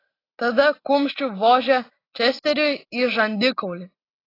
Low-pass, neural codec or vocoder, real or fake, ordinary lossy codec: 5.4 kHz; none; real; AAC, 24 kbps